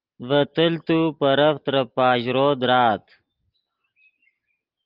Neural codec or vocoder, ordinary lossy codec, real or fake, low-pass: none; Opus, 32 kbps; real; 5.4 kHz